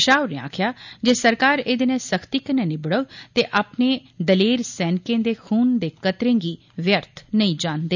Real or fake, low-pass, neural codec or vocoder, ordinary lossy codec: real; 7.2 kHz; none; none